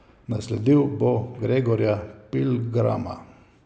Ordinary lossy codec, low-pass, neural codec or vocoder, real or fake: none; none; none; real